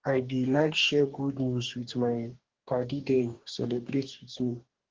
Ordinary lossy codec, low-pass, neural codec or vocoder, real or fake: Opus, 16 kbps; 7.2 kHz; codec, 44.1 kHz, 2.6 kbps, DAC; fake